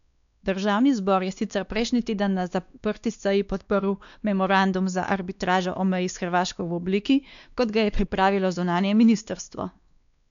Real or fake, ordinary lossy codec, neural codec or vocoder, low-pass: fake; none; codec, 16 kHz, 2 kbps, X-Codec, WavLM features, trained on Multilingual LibriSpeech; 7.2 kHz